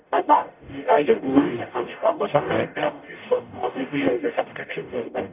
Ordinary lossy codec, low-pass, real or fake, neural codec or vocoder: none; 3.6 kHz; fake; codec, 44.1 kHz, 0.9 kbps, DAC